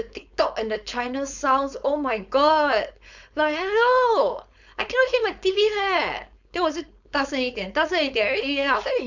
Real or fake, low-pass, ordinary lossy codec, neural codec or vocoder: fake; 7.2 kHz; none; codec, 16 kHz, 4.8 kbps, FACodec